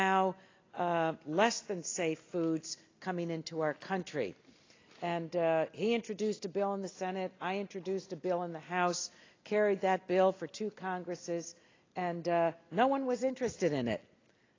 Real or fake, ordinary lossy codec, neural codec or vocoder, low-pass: real; AAC, 32 kbps; none; 7.2 kHz